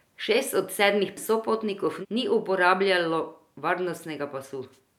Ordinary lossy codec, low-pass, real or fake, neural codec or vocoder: none; 19.8 kHz; real; none